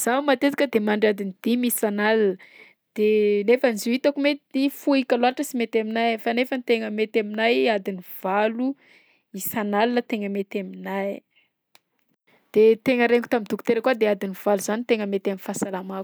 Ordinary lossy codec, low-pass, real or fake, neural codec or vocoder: none; none; real; none